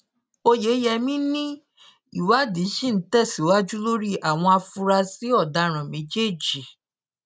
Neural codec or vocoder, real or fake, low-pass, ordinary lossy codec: none; real; none; none